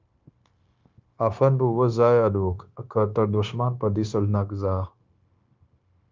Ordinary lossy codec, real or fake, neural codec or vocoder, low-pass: Opus, 24 kbps; fake; codec, 16 kHz, 0.9 kbps, LongCat-Audio-Codec; 7.2 kHz